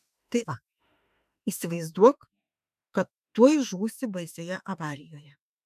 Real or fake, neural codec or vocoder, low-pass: fake; autoencoder, 48 kHz, 32 numbers a frame, DAC-VAE, trained on Japanese speech; 14.4 kHz